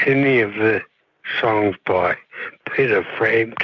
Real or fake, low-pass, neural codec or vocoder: real; 7.2 kHz; none